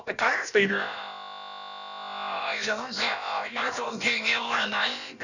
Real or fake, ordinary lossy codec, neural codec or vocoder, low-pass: fake; none; codec, 16 kHz, about 1 kbps, DyCAST, with the encoder's durations; 7.2 kHz